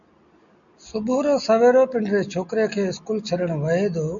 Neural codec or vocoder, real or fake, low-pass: none; real; 7.2 kHz